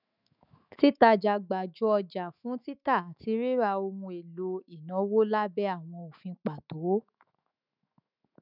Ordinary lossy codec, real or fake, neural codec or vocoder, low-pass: none; fake; autoencoder, 48 kHz, 128 numbers a frame, DAC-VAE, trained on Japanese speech; 5.4 kHz